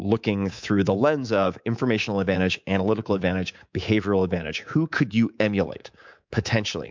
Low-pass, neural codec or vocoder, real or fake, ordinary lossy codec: 7.2 kHz; codec, 24 kHz, 3.1 kbps, DualCodec; fake; MP3, 64 kbps